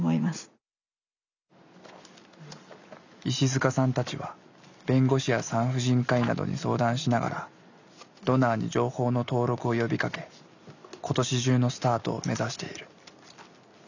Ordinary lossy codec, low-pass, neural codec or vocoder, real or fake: none; 7.2 kHz; none; real